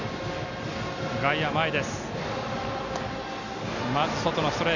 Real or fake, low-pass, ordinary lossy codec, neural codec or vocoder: real; 7.2 kHz; none; none